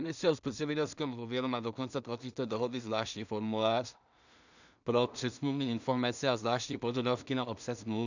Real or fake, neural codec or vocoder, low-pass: fake; codec, 16 kHz in and 24 kHz out, 0.4 kbps, LongCat-Audio-Codec, two codebook decoder; 7.2 kHz